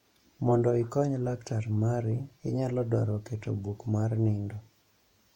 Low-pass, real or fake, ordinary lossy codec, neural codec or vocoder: 19.8 kHz; fake; MP3, 64 kbps; vocoder, 48 kHz, 128 mel bands, Vocos